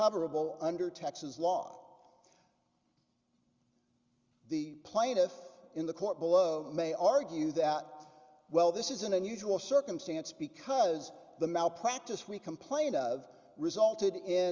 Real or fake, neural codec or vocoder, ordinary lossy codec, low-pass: real; none; Opus, 32 kbps; 7.2 kHz